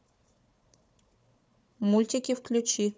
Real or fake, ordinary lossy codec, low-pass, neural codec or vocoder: fake; none; none; codec, 16 kHz, 4 kbps, FunCodec, trained on Chinese and English, 50 frames a second